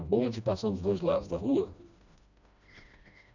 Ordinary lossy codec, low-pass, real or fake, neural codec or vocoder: none; 7.2 kHz; fake; codec, 16 kHz, 1 kbps, FreqCodec, smaller model